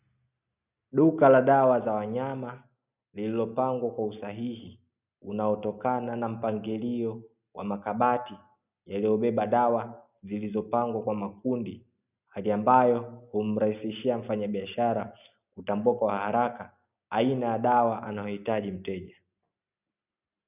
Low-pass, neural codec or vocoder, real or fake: 3.6 kHz; none; real